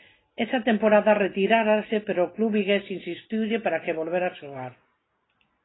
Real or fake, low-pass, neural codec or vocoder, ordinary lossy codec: real; 7.2 kHz; none; AAC, 16 kbps